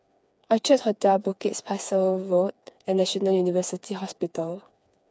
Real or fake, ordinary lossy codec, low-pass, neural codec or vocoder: fake; none; none; codec, 16 kHz, 4 kbps, FreqCodec, smaller model